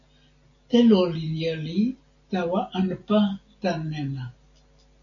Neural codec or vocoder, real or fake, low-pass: none; real; 7.2 kHz